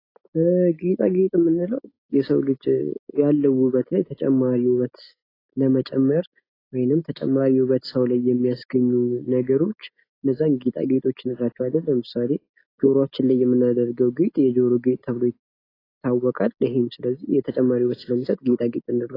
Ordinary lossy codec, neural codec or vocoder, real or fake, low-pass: AAC, 24 kbps; none; real; 5.4 kHz